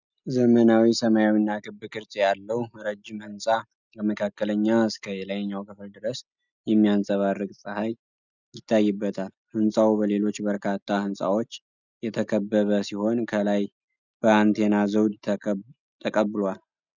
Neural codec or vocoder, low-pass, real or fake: none; 7.2 kHz; real